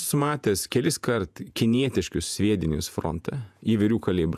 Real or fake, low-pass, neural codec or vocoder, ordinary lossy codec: fake; 14.4 kHz; vocoder, 48 kHz, 128 mel bands, Vocos; AAC, 96 kbps